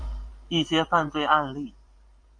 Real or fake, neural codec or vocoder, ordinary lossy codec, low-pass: fake; vocoder, 44.1 kHz, 128 mel bands every 512 samples, BigVGAN v2; MP3, 64 kbps; 9.9 kHz